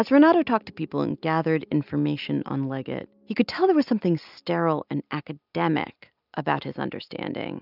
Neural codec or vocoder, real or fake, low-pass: none; real; 5.4 kHz